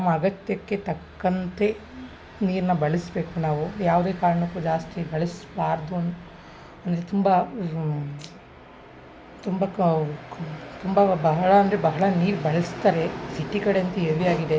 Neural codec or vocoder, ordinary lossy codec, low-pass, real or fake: none; none; none; real